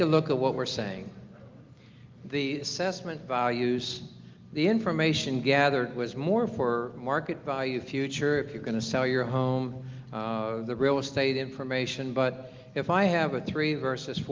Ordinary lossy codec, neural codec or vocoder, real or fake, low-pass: Opus, 32 kbps; none; real; 7.2 kHz